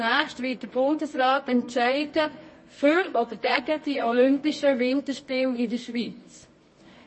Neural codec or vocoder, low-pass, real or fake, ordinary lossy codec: codec, 24 kHz, 0.9 kbps, WavTokenizer, medium music audio release; 9.9 kHz; fake; MP3, 32 kbps